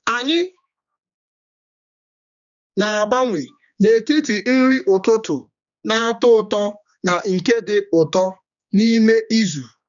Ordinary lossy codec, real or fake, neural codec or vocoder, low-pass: none; fake; codec, 16 kHz, 2 kbps, X-Codec, HuBERT features, trained on general audio; 7.2 kHz